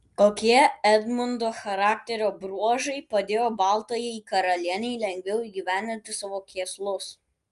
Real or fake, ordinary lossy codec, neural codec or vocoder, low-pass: real; Opus, 32 kbps; none; 10.8 kHz